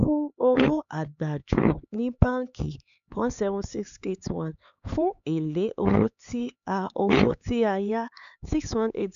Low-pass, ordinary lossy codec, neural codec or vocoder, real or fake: 7.2 kHz; none; codec, 16 kHz, 4 kbps, X-Codec, HuBERT features, trained on LibriSpeech; fake